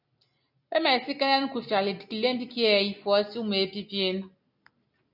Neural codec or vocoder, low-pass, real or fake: none; 5.4 kHz; real